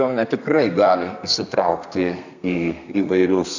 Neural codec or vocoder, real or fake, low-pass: codec, 32 kHz, 1.9 kbps, SNAC; fake; 7.2 kHz